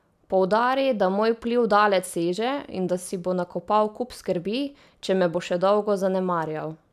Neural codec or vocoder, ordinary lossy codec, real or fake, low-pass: none; none; real; 14.4 kHz